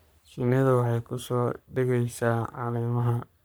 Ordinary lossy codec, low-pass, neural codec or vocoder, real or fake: none; none; codec, 44.1 kHz, 3.4 kbps, Pupu-Codec; fake